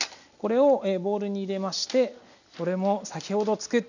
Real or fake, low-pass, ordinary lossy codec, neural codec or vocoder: real; 7.2 kHz; none; none